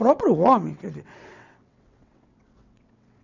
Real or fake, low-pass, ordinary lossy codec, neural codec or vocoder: fake; 7.2 kHz; none; vocoder, 22.05 kHz, 80 mel bands, WaveNeXt